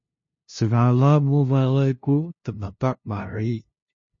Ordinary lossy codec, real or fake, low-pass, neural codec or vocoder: MP3, 32 kbps; fake; 7.2 kHz; codec, 16 kHz, 0.5 kbps, FunCodec, trained on LibriTTS, 25 frames a second